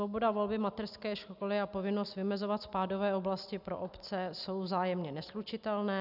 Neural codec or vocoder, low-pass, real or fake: none; 5.4 kHz; real